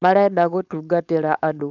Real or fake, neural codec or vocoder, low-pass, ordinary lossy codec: fake; codec, 16 kHz, 4.8 kbps, FACodec; 7.2 kHz; none